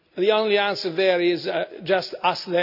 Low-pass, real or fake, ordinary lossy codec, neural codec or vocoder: 5.4 kHz; real; none; none